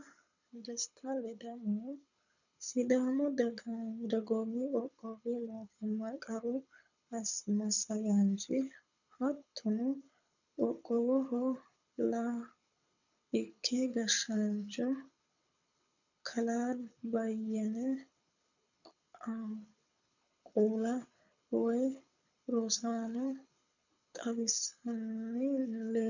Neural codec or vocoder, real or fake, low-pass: codec, 24 kHz, 6 kbps, HILCodec; fake; 7.2 kHz